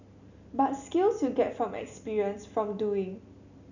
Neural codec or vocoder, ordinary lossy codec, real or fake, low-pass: none; none; real; 7.2 kHz